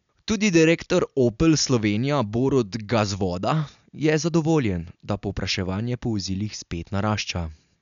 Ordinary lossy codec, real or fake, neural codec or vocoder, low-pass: none; real; none; 7.2 kHz